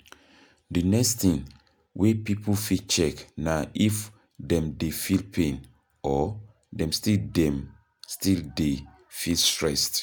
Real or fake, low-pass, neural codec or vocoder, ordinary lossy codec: real; none; none; none